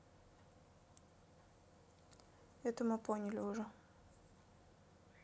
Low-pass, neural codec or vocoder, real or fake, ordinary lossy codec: none; none; real; none